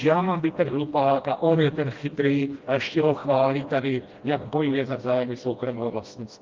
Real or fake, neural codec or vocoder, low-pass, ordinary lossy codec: fake; codec, 16 kHz, 1 kbps, FreqCodec, smaller model; 7.2 kHz; Opus, 32 kbps